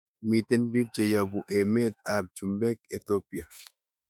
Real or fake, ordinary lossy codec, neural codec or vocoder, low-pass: fake; none; autoencoder, 48 kHz, 32 numbers a frame, DAC-VAE, trained on Japanese speech; 19.8 kHz